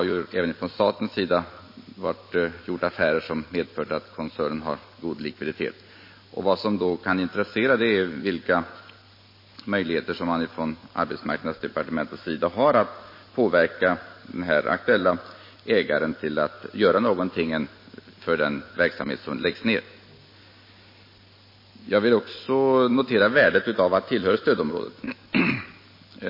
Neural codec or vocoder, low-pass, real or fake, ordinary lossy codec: none; 5.4 kHz; real; MP3, 24 kbps